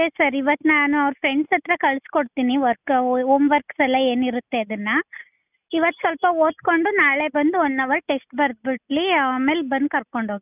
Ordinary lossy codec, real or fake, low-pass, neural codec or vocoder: none; real; 3.6 kHz; none